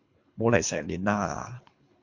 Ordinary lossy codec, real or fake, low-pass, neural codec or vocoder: MP3, 48 kbps; fake; 7.2 kHz; codec, 24 kHz, 3 kbps, HILCodec